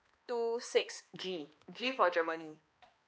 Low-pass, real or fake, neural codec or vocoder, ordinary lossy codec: none; fake; codec, 16 kHz, 2 kbps, X-Codec, HuBERT features, trained on balanced general audio; none